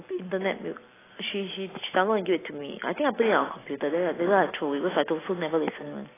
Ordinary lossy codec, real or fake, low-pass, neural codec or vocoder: AAC, 16 kbps; real; 3.6 kHz; none